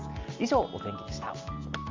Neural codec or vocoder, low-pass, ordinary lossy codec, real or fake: none; 7.2 kHz; Opus, 32 kbps; real